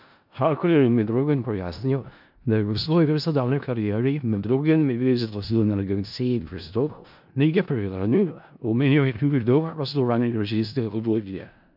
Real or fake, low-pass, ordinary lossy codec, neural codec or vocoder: fake; 5.4 kHz; MP3, 48 kbps; codec, 16 kHz in and 24 kHz out, 0.4 kbps, LongCat-Audio-Codec, four codebook decoder